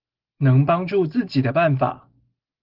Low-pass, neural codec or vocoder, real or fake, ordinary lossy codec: 5.4 kHz; none; real; Opus, 16 kbps